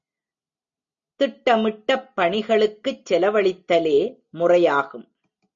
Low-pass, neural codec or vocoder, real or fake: 7.2 kHz; none; real